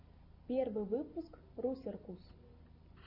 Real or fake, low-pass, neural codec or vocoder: real; 5.4 kHz; none